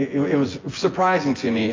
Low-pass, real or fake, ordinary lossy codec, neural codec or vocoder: 7.2 kHz; fake; AAC, 32 kbps; vocoder, 24 kHz, 100 mel bands, Vocos